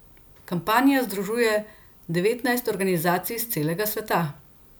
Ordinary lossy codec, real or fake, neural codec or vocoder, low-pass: none; real; none; none